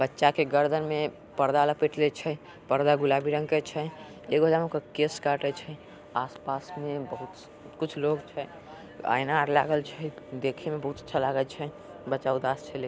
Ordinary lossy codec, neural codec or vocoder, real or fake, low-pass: none; none; real; none